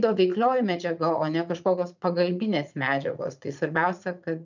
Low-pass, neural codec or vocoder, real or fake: 7.2 kHz; vocoder, 44.1 kHz, 80 mel bands, Vocos; fake